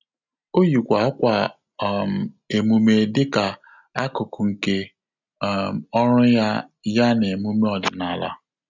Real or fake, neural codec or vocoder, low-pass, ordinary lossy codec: real; none; 7.2 kHz; none